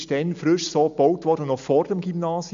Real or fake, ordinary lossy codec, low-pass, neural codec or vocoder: real; MP3, 64 kbps; 7.2 kHz; none